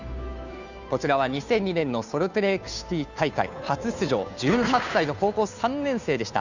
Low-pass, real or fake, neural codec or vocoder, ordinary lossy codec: 7.2 kHz; fake; codec, 16 kHz, 2 kbps, FunCodec, trained on Chinese and English, 25 frames a second; none